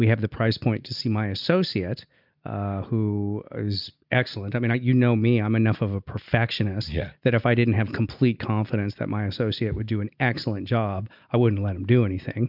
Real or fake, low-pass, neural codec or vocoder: real; 5.4 kHz; none